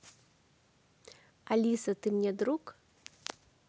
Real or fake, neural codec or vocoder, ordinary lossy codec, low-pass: real; none; none; none